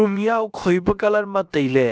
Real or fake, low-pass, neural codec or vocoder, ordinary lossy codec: fake; none; codec, 16 kHz, about 1 kbps, DyCAST, with the encoder's durations; none